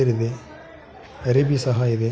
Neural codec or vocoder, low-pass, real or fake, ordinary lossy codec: none; none; real; none